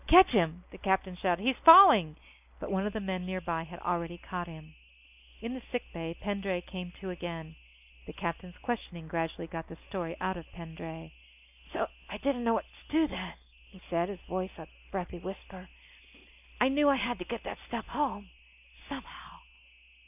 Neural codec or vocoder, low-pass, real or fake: none; 3.6 kHz; real